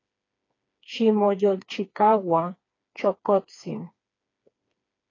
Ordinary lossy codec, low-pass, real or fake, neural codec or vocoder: AAC, 32 kbps; 7.2 kHz; fake; codec, 16 kHz, 4 kbps, FreqCodec, smaller model